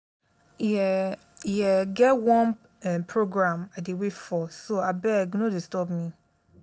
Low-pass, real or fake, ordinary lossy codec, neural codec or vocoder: none; real; none; none